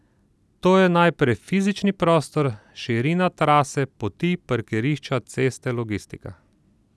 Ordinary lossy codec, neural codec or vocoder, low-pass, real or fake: none; none; none; real